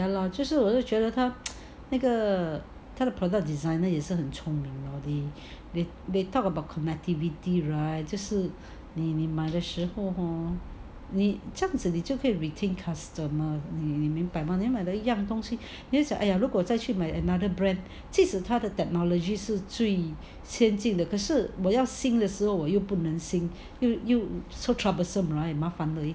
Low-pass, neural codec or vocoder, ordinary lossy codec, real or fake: none; none; none; real